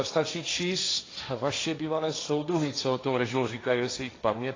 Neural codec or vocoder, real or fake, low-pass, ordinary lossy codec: codec, 16 kHz, 1.1 kbps, Voila-Tokenizer; fake; 7.2 kHz; AAC, 32 kbps